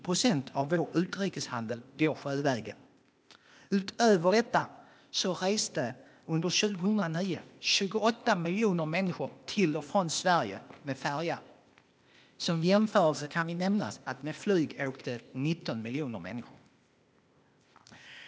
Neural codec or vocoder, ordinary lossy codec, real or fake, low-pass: codec, 16 kHz, 0.8 kbps, ZipCodec; none; fake; none